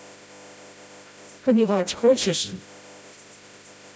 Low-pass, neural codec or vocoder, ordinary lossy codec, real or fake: none; codec, 16 kHz, 0.5 kbps, FreqCodec, smaller model; none; fake